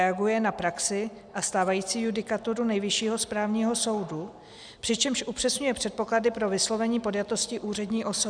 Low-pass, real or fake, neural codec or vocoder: 9.9 kHz; real; none